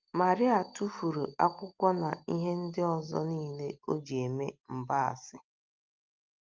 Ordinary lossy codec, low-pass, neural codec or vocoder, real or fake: Opus, 32 kbps; 7.2 kHz; none; real